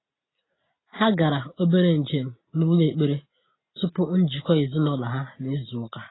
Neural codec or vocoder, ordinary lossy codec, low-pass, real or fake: none; AAC, 16 kbps; 7.2 kHz; real